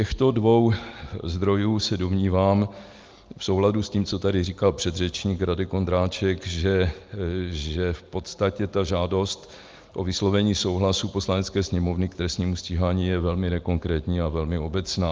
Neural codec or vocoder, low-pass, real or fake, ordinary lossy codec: none; 7.2 kHz; real; Opus, 32 kbps